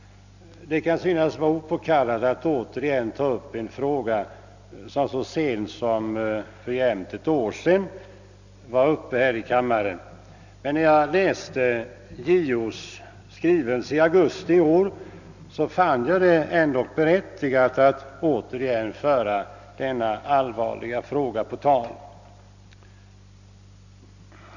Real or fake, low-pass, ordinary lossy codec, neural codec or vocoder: real; 7.2 kHz; none; none